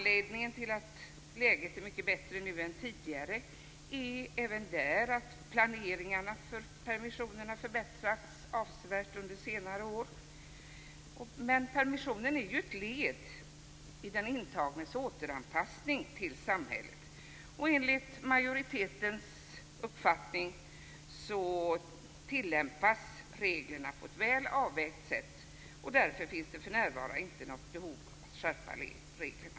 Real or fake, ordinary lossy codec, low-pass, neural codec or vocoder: real; none; none; none